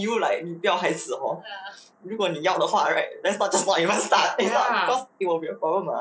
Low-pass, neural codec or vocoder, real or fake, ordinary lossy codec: none; none; real; none